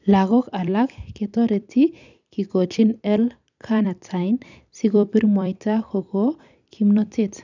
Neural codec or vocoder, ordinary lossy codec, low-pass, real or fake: vocoder, 44.1 kHz, 128 mel bands every 256 samples, BigVGAN v2; none; 7.2 kHz; fake